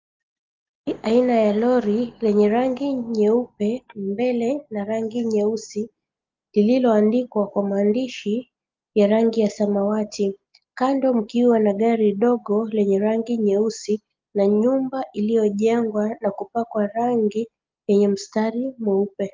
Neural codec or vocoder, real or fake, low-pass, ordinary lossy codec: none; real; 7.2 kHz; Opus, 24 kbps